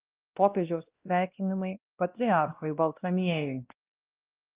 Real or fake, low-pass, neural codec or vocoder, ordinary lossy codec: fake; 3.6 kHz; codec, 16 kHz, 1 kbps, X-Codec, WavLM features, trained on Multilingual LibriSpeech; Opus, 24 kbps